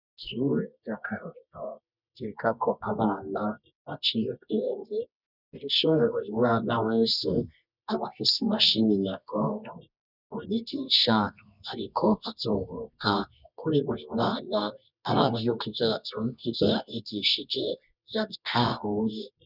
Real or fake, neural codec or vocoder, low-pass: fake; codec, 24 kHz, 0.9 kbps, WavTokenizer, medium music audio release; 5.4 kHz